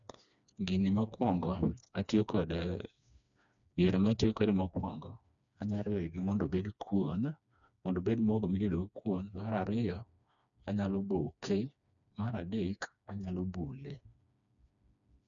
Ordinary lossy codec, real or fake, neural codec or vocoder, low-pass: none; fake; codec, 16 kHz, 2 kbps, FreqCodec, smaller model; 7.2 kHz